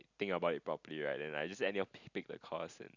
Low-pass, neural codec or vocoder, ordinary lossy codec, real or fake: 7.2 kHz; none; none; real